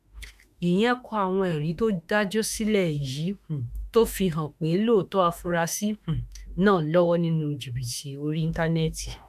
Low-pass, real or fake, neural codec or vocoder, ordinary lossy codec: 14.4 kHz; fake; autoencoder, 48 kHz, 32 numbers a frame, DAC-VAE, trained on Japanese speech; none